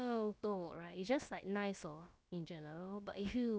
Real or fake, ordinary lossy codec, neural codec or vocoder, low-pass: fake; none; codec, 16 kHz, about 1 kbps, DyCAST, with the encoder's durations; none